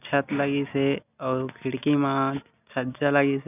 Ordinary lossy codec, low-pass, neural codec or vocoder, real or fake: none; 3.6 kHz; none; real